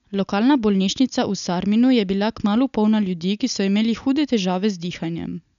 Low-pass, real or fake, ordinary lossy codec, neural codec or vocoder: 7.2 kHz; real; none; none